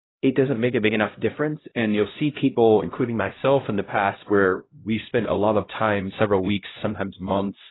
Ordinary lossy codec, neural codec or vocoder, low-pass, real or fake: AAC, 16 kbps; codec, 16 kHz, 0.5 kbps, X-Codec, HuBERT features, trained on LibriSpeech; 7.2 kHz; fake